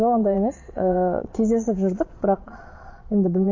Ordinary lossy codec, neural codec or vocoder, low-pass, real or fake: MP3, 32 kbps; vocoder, 22.05 kHz, 80 mel bands, Vocos; 7.2 kHz; fake